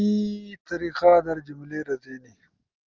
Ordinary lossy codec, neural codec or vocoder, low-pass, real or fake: Opus, 32 kbps; none; 7.2 kHz; real